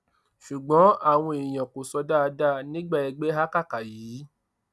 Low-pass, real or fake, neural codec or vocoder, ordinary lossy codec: none; real; none; none